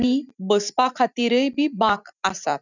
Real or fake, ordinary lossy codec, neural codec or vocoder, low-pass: fake; none; vocoder, 44.1 kHz, 128 mel bands every 256 samples, BigVGAN v2; 7.2 kHz